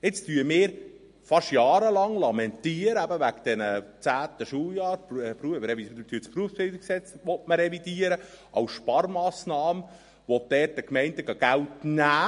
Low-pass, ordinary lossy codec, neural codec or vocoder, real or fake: 10.8 kHz; MP3, 48 kbps; none; real